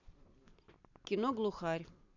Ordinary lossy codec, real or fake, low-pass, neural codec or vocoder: none; fake; 7.2 kHz; vocoder, 44.1 kHz, 128 mel bands every 256 samples, BigVGAN v2